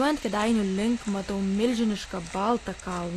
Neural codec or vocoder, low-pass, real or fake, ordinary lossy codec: none; 14.4 kHz; real; AAC, 48 kbps